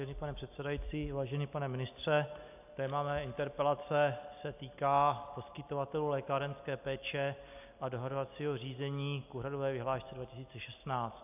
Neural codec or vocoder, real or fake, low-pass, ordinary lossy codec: none; real; 3.6 kHz; AAC, 32 kbps